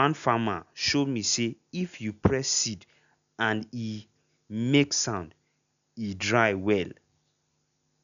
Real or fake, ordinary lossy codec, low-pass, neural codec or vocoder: real; none; 7.2 kHz; none